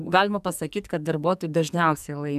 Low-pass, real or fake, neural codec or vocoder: 14.4 kHz; fake; codec, 44.1 kHz, 2.6 kbps, SNAC